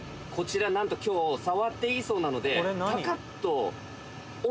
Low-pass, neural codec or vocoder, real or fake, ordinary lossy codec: none; none; real; none